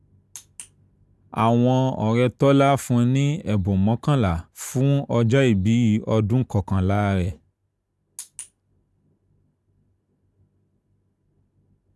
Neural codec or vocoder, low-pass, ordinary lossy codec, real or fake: none; none; none; real